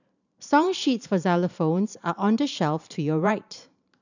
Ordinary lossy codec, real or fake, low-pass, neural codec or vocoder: none; fake; 7.2 kHz; vocoder, 44.1 kHz, 128 mel bands every 512 samples, BigVGAN v2